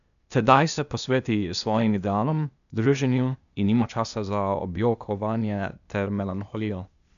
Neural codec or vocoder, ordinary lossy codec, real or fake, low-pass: codec, 16 kHz, 0.8 kbps, ZipCodec; none; fake; 7.2 kHz